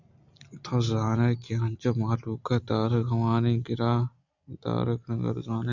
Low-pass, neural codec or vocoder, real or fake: 7.2 kHz; none; real